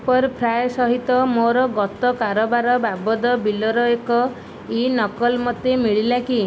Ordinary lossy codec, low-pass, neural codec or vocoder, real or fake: none; none; none; real